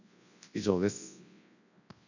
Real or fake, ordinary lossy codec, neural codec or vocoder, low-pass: fake; none; codec, 24 kHz, 0.9 kbps, WavTokenizer, large speech release; 7.2 kHz